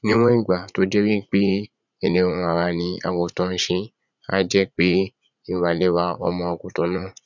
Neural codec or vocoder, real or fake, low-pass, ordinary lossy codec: vocoder, 44.1 kHz, 80 mel bands, Vocos; fake; 7.2 kHz; none